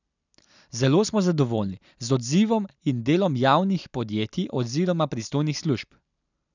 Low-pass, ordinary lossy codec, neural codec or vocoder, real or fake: 7.2 kHz; none; none; real